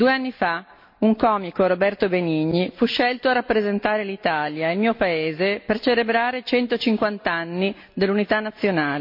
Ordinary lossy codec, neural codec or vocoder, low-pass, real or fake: MP3, 32 kbps; none; 5.4 kHz; real